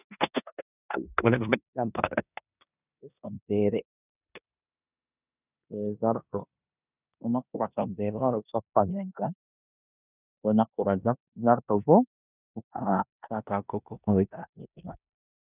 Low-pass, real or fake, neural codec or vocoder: 3.6 kHz; fake; codec, 16 kHz in and 24 kHz out, 0.9 kbps, LongCat-Audio-Codec, four codebook decoder